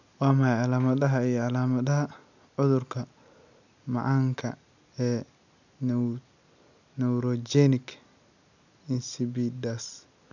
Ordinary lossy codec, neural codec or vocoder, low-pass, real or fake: none; none; 7.2 kHz; real